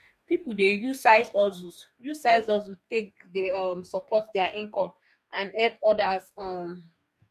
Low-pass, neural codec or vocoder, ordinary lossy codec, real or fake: 14.4 kHz; codec, 44.1 kHz, 2.6 kbps, DAC; MP3, 96 kbps; fake